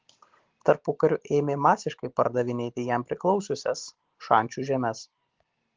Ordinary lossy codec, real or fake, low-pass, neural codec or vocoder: Opus, 16 kbps; real; 7.2 kHz; none